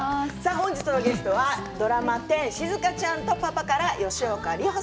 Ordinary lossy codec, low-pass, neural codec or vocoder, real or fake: none; none; none; real